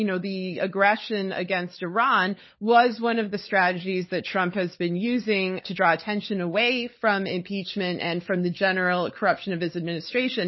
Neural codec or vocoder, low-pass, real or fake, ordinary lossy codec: codec, 16 kHz, 16 kbps, FunCodec, trained on LibriTTS, 50 frames a second; 7.2 kHz; fake; MP3, 24 kbps